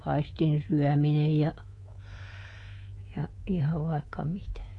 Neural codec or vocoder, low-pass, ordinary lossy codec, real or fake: codec, 24 kHz, 3.1 kbps, DualCodec; 10.8 kHz; AAC, 32 kbps; fake